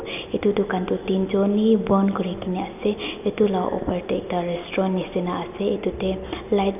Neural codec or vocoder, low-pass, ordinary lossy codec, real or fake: none; 3.6 kHz; none; real